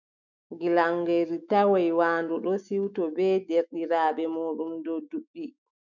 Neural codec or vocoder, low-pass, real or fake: autoencoder, 48 kHz, 128 numbers a frame, DAC-VAE, trained on Japanese speech; 7.2 kHz; fake